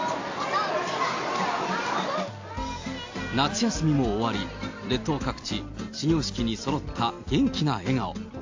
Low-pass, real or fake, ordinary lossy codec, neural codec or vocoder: 7.2 kHz; real; AAC, 48 kbps; none